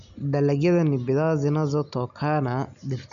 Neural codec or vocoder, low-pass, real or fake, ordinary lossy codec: none; 7.2 kHz; real; none